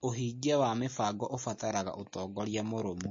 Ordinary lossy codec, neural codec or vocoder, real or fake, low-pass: MP3, 32 kbps; none; real; 7.2 kHz